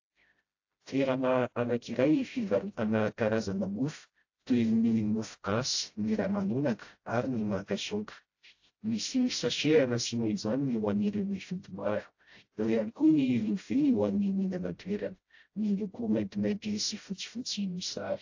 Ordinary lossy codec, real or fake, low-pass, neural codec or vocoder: AAC, 48 kbps; fake; 7.2 kHz; codec, 16 kHz, 0.5 kbps, FreqCodec, smaller model